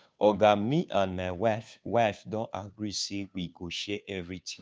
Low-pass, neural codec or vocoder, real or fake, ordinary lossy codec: none; codec, 16 kHz, 2 kbps, FunCodec, trained on Chinese and English, 25 frames a second; fake; none